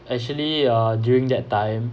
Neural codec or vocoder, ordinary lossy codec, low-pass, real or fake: none; none; none; real